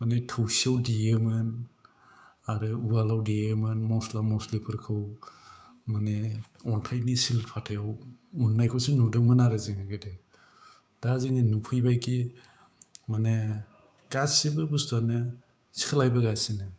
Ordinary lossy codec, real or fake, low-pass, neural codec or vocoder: none; fake; none; codec, 16 kHz, 6 kbps, DAC